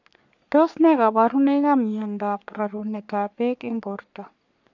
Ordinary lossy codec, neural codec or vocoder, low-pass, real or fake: none; codec, 44.1 kHz, 3.4 kbps, Pupu-Codec; 7.2 kHz; fake